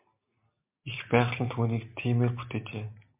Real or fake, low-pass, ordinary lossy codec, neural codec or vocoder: real; 3.6 kHz; MP3, 32 kbps; none